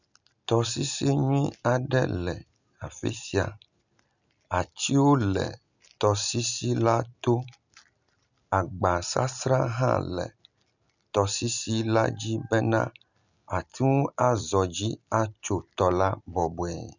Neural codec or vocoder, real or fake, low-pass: none; real; 7.2 kHz